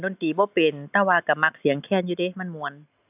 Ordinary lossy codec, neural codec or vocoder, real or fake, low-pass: AAC, 32 kbps; none; real; 3.6 kHz